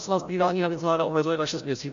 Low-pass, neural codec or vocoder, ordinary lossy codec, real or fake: 7.2 kHz; codec, 16 kHz, 0.5 kbps, FreqCodec, larger model; AAC, 64 kbps; fake